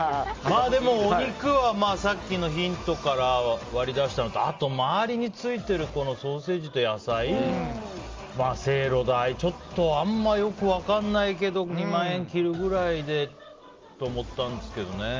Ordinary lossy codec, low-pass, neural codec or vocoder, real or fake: Opus, 32 kbps; 7.2 kHz; none; real